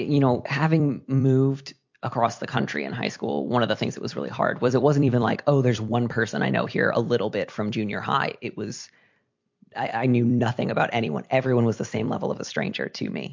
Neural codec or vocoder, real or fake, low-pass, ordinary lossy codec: vocoder, 44.1 kHz, 128 mel bands every 256 samples, BigVGAN v2; fake; 7.2 kHz; MP3, 48 kbps